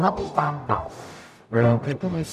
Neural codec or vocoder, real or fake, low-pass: codec, 44.1 kHz, 0.9 kbps, DAC; fake; 14.4 kHz